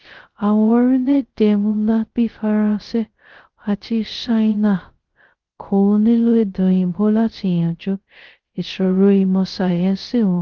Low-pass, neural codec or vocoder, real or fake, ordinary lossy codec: 7.2 kHz; codec, 16 kHz, 0.2 kbps, FocalCodec; fake; Opus, 16 kbps